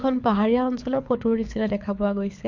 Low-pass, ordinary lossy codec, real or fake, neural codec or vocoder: 7.2 kHz; MP3, 64 kbps; fake; codec, 24 kHz, 6 kbps, HILCodec